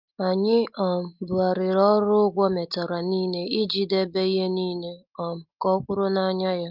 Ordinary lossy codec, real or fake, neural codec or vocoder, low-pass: Opus, 32 kbps; real; none; 5.4 kHz